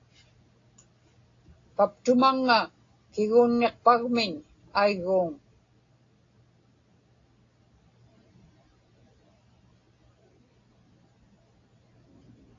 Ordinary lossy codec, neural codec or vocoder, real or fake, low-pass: AAC, 32 kbps; none; real; 7.2 kHz